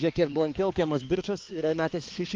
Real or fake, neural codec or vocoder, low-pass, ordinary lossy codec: fake; codec, 16 kHz, 2 kbps, X-Codec, HuBERT features, trained on balanced general audio; 7.2 kHz; Opus, 32 kbps